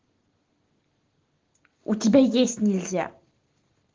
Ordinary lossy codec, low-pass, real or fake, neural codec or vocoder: Opus, 16 kbps; 7.2 kHz; real; none